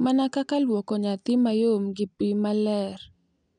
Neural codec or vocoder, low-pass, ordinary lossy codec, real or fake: none; 9.9 kHz; none; real